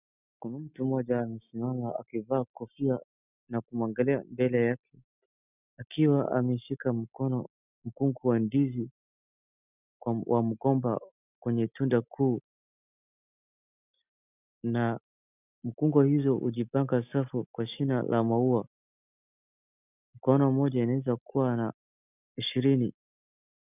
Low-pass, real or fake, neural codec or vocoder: 3.6 kHz; real; none